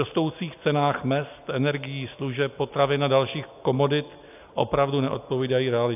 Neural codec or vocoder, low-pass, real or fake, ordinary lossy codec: none; 3.6 kHz; real; AAC, 32 kbps